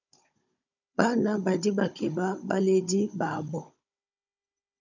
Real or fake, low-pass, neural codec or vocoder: fake; 7.2 kHz; codec, 16 kHz, 16 kbps, FunCodec, trained on Chinese and English, 50 frames a second